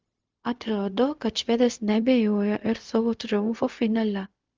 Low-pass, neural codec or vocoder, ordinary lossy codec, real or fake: 7.2 kHz; codec, 16 kHz, 0.4 kbps, LongCat-Audio-Codec; Opus, 24 kbps; fake